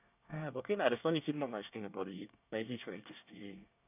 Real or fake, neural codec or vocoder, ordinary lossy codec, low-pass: fake; codec, 24 kHz, 1 kbps, SNAC; none; 3.6 kHz